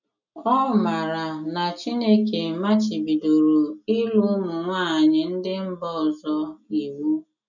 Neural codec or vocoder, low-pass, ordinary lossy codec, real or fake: none; 7.2 kHz; none; real